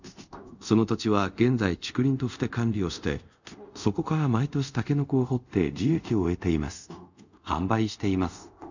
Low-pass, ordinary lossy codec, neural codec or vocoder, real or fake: 7.2 kHz; none; codec, 24 kHz, 0.5 kbps, DualCodec; fake